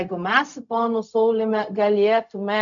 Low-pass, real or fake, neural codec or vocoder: 7.2 kHz; fake; codec, 16 kHz, 0.4 kbps, LongCat-Audio-Codec